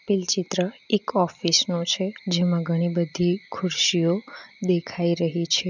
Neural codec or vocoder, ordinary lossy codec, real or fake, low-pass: none; none; real; 7.2 kHz